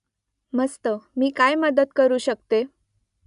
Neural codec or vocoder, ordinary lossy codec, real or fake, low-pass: none; none; real; 10.8 kHz